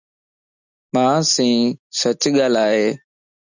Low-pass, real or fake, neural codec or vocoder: 7.2 kHz; real; none